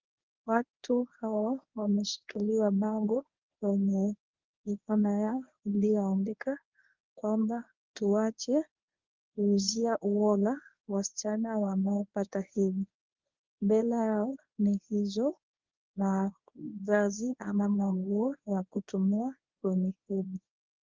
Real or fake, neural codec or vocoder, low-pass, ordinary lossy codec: fake; codec, 24 kHz, 0.9 kbps, WavTokenizer, medium speech release version 1; 7.2 kHz; Opus, 24 kbps